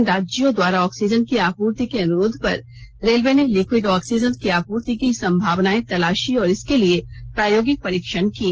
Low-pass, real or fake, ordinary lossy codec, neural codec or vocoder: 7.2 kHz; real; Opus, 16 kbps; none